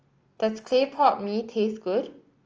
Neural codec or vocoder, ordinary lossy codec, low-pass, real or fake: none; Opus, 24 kbps; 7.2 kHz; real